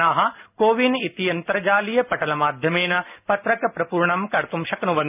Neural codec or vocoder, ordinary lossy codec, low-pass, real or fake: none; none; 3.6 kHz; real